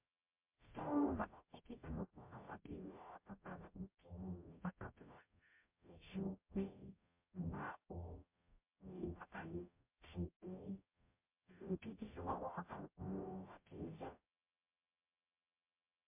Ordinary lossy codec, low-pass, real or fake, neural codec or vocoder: MP3, 32 kbps; 3.6 kHz; fake; codec, 44.1 kHz, 0.9 kbps, DAC